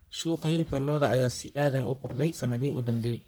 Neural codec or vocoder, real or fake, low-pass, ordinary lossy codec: codec, 44.1 kHz, 1.7 kbps, Pupu-Codec; fake; none; none